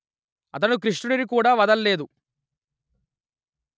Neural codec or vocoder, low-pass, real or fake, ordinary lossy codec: none; none; real; none